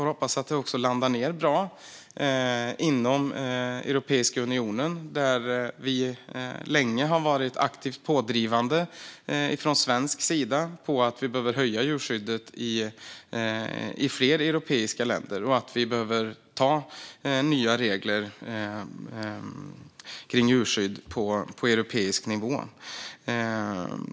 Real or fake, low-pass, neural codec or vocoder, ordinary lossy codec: real; none; none; none